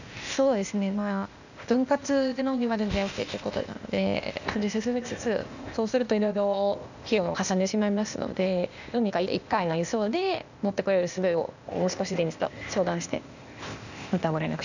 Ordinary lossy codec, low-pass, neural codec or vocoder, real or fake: none; 7.2 kHz; codec, 16 kHz, 0.8 kbps, ZipCodec; fake